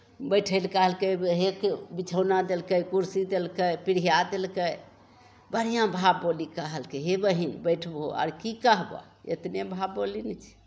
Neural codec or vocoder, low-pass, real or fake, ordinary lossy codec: none; none; real; none